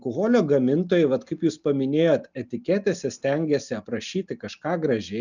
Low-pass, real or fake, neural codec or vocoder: 7.2 kHz; real; none